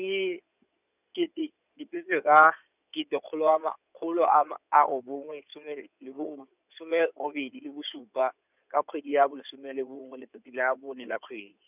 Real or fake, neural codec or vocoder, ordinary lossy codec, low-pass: fake; codec, 16 kHz in and 24 kHz out, 2.2 kbps, FireRedTTS-2 codec; none; 3.6 kHz